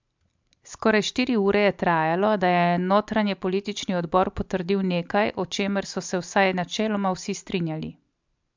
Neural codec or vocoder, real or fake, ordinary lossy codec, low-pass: none; real; MP3, 64 kbps; 7.2 kHz